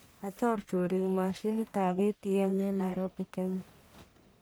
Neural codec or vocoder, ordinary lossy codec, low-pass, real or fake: codec, 44.1 kHz, 1.7 kbps, Pupu-Codec; none; none; fake